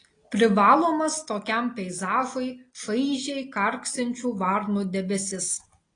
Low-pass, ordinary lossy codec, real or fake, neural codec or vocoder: 9.9 kHz; AAC, 32 kbps; real; none